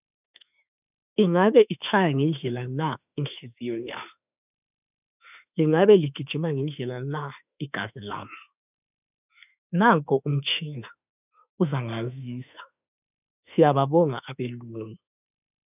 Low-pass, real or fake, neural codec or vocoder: 3.6 kHz; fake; autoencoder, 48 kHz, 32 numbers a frame, DAC-VAE, trained on Japanese speech